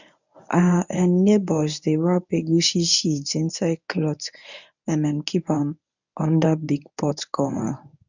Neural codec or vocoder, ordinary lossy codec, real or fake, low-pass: codec, 24 kHz, 0.9 kbps, WavTokenizer, medium speech release version 1; none; fake; 7.2 kHz